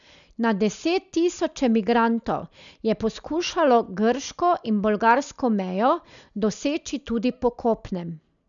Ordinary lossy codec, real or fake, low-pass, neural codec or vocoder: none; real; 7.2 kHz; none